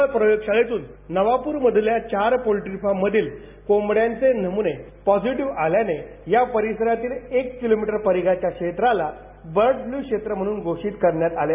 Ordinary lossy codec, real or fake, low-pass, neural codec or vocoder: none; real; 3.6 kHz; none